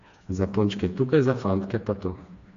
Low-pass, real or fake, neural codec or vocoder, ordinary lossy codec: 7.2 kHz; fake; codec, 16 kHz, 2 kbps, FreqCodec, smaller model; AAC, 48 kbps